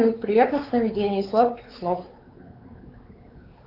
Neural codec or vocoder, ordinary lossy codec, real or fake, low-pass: codec, 16 kHz, 4 kbps, X-Codec, WavLM features, trained on Multilingual LibriSpeech; Opus, 24 kbps; fake; 5.4 kHz